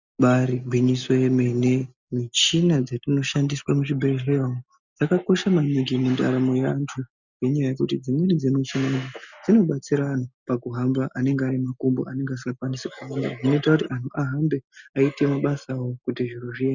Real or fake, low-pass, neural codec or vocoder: real; 7.2 kHz; none